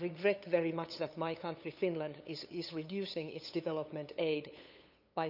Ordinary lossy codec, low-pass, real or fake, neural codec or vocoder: none; 5.4 kHz; fake; codec, 16 kHz, 8 kbps, FunCodec, trained on LibriTTS, 25 frames a second